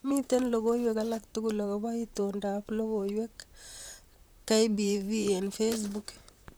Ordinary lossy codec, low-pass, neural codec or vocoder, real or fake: none; none; vocoder, 44.1 kHz, 128 mel bands, Pupu-Vocoder; fake